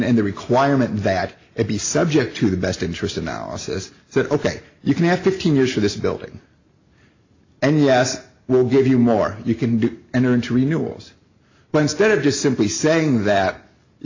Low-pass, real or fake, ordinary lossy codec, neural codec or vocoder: 7.2 kHz; real; AAC, 48 kbps; none